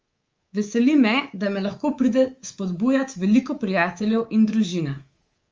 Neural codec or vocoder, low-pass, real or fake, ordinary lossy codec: codec, 24 kHz, 3.1 kbps, DualCodec; 7.2 kHz; fake; Opus, 32 kbps